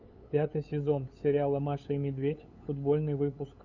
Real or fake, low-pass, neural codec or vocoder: fake; 7.2 kHz; codec, 16 kHz, 8 kbps, FunCodec, trained on LibriTTS, 25 frames a second